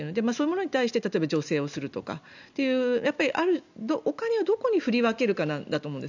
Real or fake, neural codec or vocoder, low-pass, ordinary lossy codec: real; none; 7.2 kHz; none